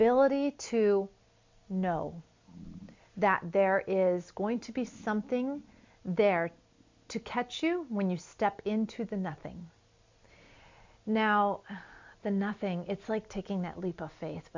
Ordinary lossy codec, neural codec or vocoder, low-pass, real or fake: MP3, 64 kbps; none; 7.2 kHz; real